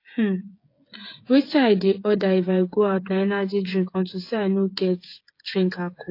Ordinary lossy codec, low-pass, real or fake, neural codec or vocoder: AAC, 32 kbps; 5.4 kHz; fake; codec, 16 kHz, 8 kbps, FreqCodec, smaller model